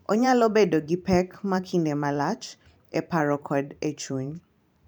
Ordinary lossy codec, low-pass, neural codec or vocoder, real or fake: none; none; vocoder, 44.1 kHz, 128 mel bands every 512 samples, BigVGAN v2; fake